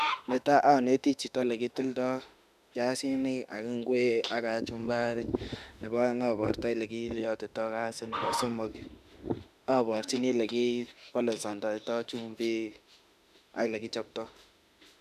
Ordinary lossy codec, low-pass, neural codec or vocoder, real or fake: none; 14.4 kHz; autoencoder, 48 kHz, 32 numbers a frame, DAC-VAE, trained on Japanese speech; fake